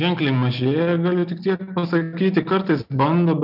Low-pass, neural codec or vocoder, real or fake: 5.4 kHz; none; real